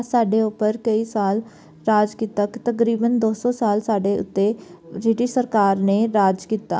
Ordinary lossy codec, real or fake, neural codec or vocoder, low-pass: none; real; none; none